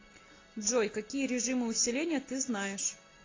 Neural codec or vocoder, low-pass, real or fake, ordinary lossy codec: none; 7.2 kHz; real; AAC, 32 kbps